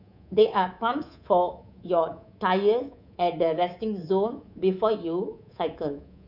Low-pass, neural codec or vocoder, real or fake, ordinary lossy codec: 5.4 kHz; codec, 24 kHz, 3.1 kbps, DualCodec; fake; none